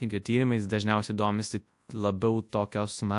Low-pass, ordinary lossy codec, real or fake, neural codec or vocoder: 10.8 kHz; AAC, 64 kbps; fake; codec, 24 kHz, 0.9 kbps, WavTokenizer, large speech release